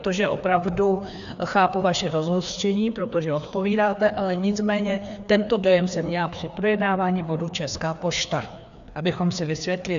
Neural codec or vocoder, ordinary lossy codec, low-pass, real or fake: codec, 16 kHz, 2 kbps, FreqCodec, larger model; AAC, 96 kbps; 7.2 kHz; fake